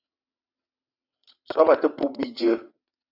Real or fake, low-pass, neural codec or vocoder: fake; 5.4 kHz; vocoder, 22.05 kHz, 80 mel bands, WaveNeXt